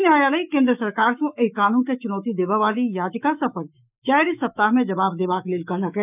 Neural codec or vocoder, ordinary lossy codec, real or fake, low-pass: autoencoder, 48 kHz, 128 numbers a frame, DAC-VAE, trained on Japanese speech; none; fake; 3.6 kHz